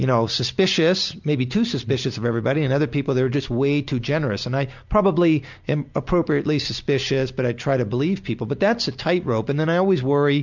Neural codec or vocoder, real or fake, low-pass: none; real; 7.2 kHz